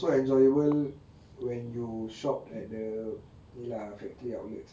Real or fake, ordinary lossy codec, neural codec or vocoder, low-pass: real; none; none; none